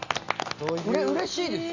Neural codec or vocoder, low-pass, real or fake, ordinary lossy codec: none; 7.2 kHz; real; Opus, 64 kbps